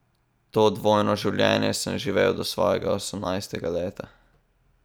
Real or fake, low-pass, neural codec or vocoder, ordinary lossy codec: real; none; none; none